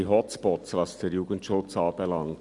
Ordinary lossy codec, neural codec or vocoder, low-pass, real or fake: none; none; 10.8 kHz; real